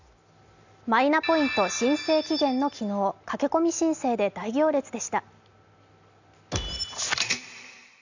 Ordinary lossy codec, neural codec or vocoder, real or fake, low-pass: none; none; real; 7.2 kHz